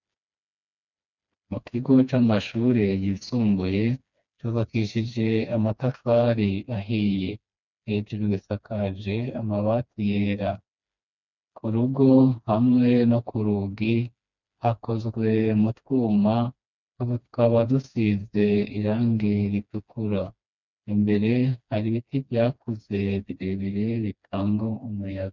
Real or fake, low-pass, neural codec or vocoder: fake; 7.2 kHz; codec, 16 kHz, 2 kbps, FreqCodec, smaller model